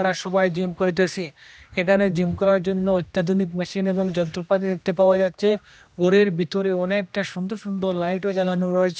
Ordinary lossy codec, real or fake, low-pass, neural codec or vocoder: none; fake; none; codec, 16 kHz, 1 kbps, X-Codec, HuBERT features, trained on general audio